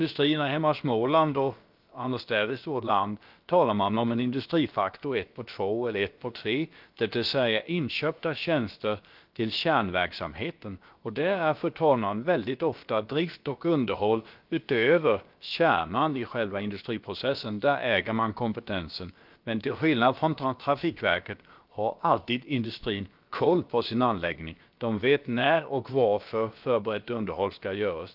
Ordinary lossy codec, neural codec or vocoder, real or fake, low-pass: Opus, 32 kbps; codec, 16 kHz, about 1 kbps, DyCAST, with the encoder's durations; fake; 5.4 kHz